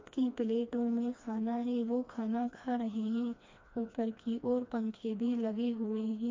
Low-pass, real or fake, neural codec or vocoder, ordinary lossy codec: 7.2 kHz; fake; codec, 16 kHz, 2 kbps, FreqCodec, smaller model; MP3, 48 kbps